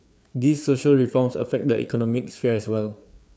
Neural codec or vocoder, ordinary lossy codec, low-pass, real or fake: codec, 16 kHz, 4 kbps, FreqCodec, larger model; none; none; fake